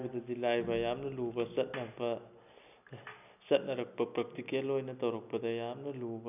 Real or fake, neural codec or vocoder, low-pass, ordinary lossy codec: real; none; 3.6 kHz; none